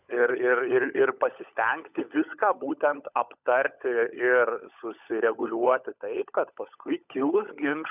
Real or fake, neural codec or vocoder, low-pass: fake; codec, 16 kHz, 16 kbps, FunCodec, trained on LibriTTS, 50 frames a second; 3.6 kHz